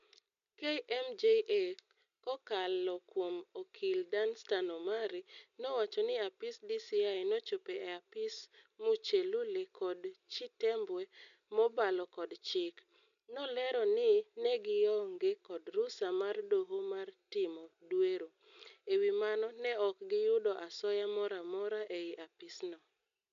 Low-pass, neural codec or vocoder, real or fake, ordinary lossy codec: 7.2 kHz; none; real; MP3, 96 kbps